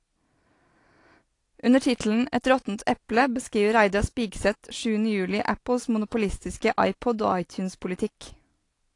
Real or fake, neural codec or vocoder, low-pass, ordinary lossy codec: real; none; 10.8 kHz; AAC, 48 kbps